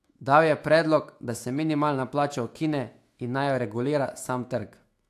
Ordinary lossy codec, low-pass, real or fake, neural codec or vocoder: AAC, 64 kbps; 14.4 kHz; fake; autoencoder, 48 kHz, 128 numbers a frame, DAC-VAE, trained on Japanese speech